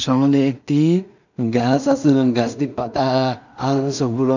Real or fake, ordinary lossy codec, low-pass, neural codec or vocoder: fake; none; 7.2 kHz; codec, 16 kHz in and 24 kHz out, 0.4 kbps, LongCat-Audio-Codec, two codebook decoder